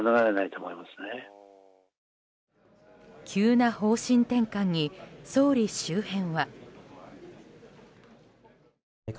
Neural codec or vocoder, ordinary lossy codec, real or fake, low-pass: none; none; real; none